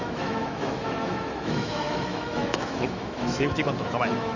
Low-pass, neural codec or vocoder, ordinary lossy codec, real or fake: 7.2 kHz; autoencoder, 48 kHz, 128 numbers a frame, DAC-VAE, trained on Japanese speech; Opus, 64 kbps; fake